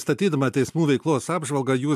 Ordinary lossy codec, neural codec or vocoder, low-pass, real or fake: MP3, 96 kbps; none; 14.4 kHz; real